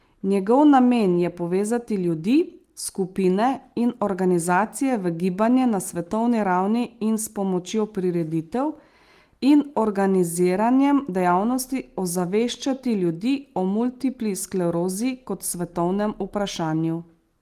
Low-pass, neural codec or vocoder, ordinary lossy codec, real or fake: 14.4 kHz; none; Opus, 24 kbps; real